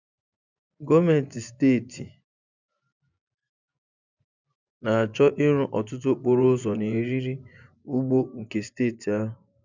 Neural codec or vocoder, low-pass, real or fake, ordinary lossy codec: vocoder, 24 kHz, 100 mel bands, Vocos; 7.2 kHz; fake; none